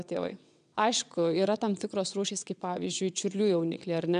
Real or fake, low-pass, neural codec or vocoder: fake; 9.9 kHz; vocoder, 22.05 kHz, 80 mel bands, WaveNeXt